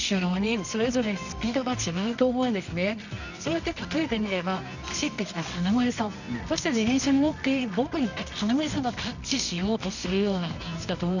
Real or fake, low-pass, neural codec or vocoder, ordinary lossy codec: fake; 7.2 kHz; codec, 24 kHz, 0.9 kbps, WavTokenizer, medium music audio release; none